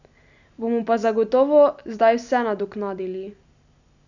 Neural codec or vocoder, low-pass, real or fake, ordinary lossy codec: none; 7.2 kHz; real; none